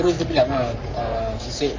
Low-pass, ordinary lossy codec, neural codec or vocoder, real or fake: 7.2 kHz; MP3, 48 kbps; codec, 44.1 kHz, 3.4 kbps, Pupu-Codec; fake